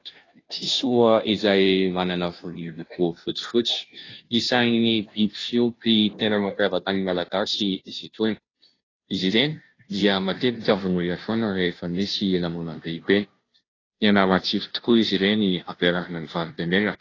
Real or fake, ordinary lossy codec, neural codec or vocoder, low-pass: fake; AAC, 32 kbps; codec, 16 kHz, 0.5 kbps, FunCodec, trained on Chinese and English, 25 frames a second; 7.2 kHz